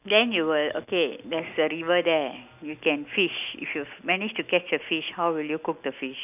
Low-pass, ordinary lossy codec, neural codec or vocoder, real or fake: 3.6 kHz; none; none; real